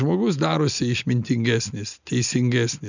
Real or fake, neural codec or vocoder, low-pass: real; none; 7.2 kHz